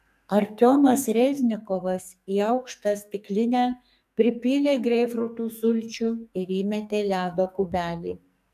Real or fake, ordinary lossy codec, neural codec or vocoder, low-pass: fake; AAC, 96 kbps; codec, 44.1 kHz, 2.6 kbps, SNAC; 14.4 kHz